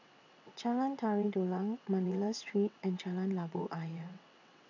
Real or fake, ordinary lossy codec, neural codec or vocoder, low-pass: fake; none; vocoder, 22.05 kHz, 80 mel bands, WaveNeXt; 7.2 kHz